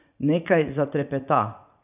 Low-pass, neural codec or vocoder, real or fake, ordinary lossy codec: 3.6 kHz; vocoder, 44.1 kHz, 80 mel bands, Vocos; fake; none